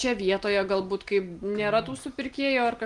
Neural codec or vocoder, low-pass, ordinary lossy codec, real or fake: none; 10.8 kHz; Opus, 24 kbps; real